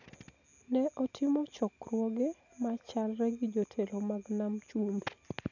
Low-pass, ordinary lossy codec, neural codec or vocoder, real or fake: 7.2 kHz; none; none; real